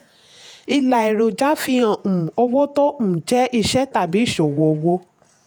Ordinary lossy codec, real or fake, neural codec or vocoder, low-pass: none; fake; vocoder, 48 kHz, 128 mel bands, Vocos; none